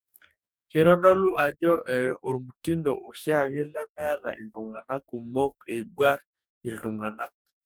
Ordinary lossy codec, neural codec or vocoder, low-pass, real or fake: none; codec, 44.1 kHz, 2.6 kbps, DAC; none; fake